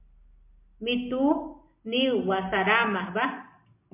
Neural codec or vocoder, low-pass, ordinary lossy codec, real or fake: none; 3.6 kHz; MP3, 24 kbps; real